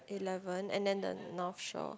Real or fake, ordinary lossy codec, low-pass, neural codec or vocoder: real; none; none; none